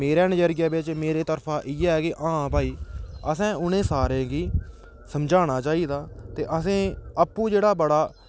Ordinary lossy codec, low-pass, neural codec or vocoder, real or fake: none; none; none; real